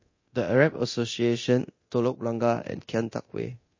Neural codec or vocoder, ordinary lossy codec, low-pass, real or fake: codec, 24 kHz, 0.9 kbps, DualCodec; MP3, 32 kbps; 7.2 kHz; fake